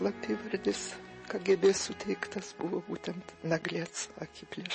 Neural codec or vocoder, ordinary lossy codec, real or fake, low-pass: none; MP3, 32 kbps; real; 10.8 kHz